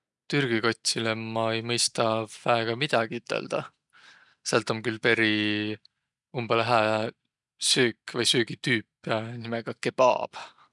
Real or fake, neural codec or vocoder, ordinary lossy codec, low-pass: real; none; none; 10.8 kHz